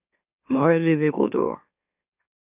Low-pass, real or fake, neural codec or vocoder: 3.6 kHz; fake; autoencoder, 44.1 kHz, a latent of 192 numbers a frame, MeloTTS